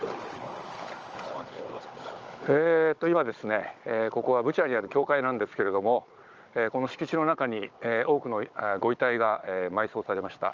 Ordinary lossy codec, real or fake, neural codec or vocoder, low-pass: Opus, 24 kbps; fake; codec, 16 kHz, 16 kbps, FunCodec, trained on Chinese and English, 50 frames a second; 7.2 kHz